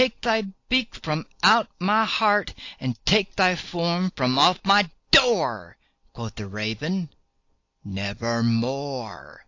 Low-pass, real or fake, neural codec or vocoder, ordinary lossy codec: 7.2 kHz; real; none; AAC, 48 kbps